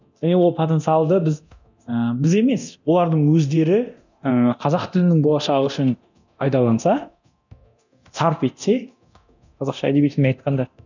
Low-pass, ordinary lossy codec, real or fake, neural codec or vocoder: 7.2 kHz; none; fake; codec, 24 kHz, 0.9 kbps, DualCodec